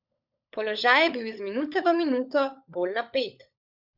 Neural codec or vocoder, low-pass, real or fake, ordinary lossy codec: codec, 16 kHz, 16 kbps, FunCodec, trained on LibriTTS, 50 frames a second; 5.4 kHz; fake; Opus, 64 kbps